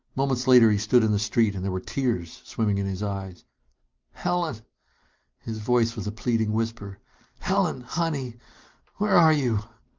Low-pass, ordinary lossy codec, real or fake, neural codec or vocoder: 7.2 kHz; Opus, 24 kbps; real; none